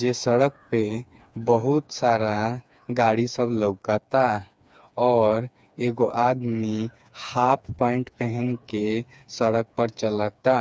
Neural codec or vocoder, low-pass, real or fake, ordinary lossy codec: codec, 16 kHz, 4 kbps, FreqCodec, smaller model; none; fake; none